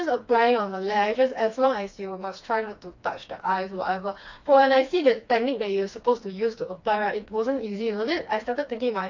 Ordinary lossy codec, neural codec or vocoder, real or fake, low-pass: AAC, 48 kbps; codec, 16 kHz, 2 kbps, FreqCodec, smaller model; fake; 7.2 kHz